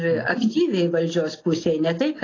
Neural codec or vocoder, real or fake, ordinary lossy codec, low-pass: none; real; AAC, 32 kbps; 7.2 kHz